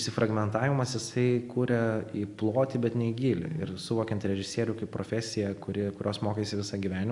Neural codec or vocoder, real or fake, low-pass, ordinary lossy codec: none; real; 10.8 kHz; AAC, 64 kbps